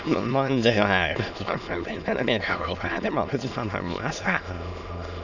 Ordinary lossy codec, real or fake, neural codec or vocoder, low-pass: none; fake; autoencoder, 22.05 kHz, a latent of 192 numbers a frame, VITS, trained on many speakers; 7.2 kHz